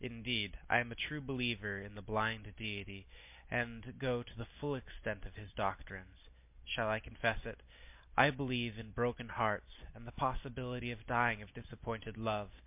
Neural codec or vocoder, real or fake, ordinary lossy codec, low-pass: none; real; MP3, 32 kbps; 3.6 kHz